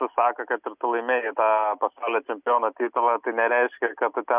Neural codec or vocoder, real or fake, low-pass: none; real; 3.6 kHz